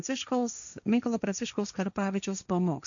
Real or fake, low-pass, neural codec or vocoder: fake; 7.2 kHz; codec, 16 kHz, 1.1 kbps, Voila-Tokenizer